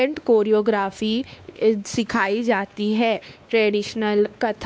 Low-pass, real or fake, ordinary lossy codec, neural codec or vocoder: none; fake; none; codec, 16 kHz, 2 kbps, X-Codec, WavLM features, trained on Multilingual LibriSpeech